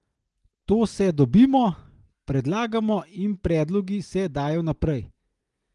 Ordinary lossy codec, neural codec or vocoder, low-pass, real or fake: Opus, 24 kbps; none; 10.8 kHz; real